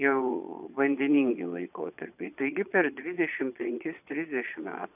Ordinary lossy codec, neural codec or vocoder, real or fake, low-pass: AAC, 32 kbps; vocoder, 22.05 kHz, 80 mel bands, Vocos; fake; 3.6 kHz